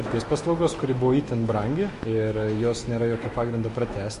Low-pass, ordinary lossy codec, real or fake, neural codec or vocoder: 14.4 kHz; MP3, 48 kbps; fake; autoencoder, 48 kHz, 128 numbers a frame, DAC-VAE, trained on Japanese speech